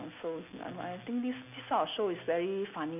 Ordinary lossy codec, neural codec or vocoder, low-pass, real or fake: none; none; 3.6 kHz; real